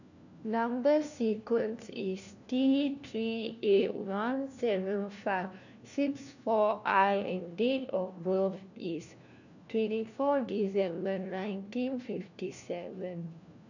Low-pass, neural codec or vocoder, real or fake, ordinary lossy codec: 7.2 kHz; codec, 16 kHz, 1 kbps, FunCodec, trained on LibriTTS, 50 frames a second; fake; none